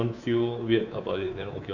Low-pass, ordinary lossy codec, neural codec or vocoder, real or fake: 7.2 kHz; none; codec, 24 kHz, 3.1 kbps, DualCodec; fake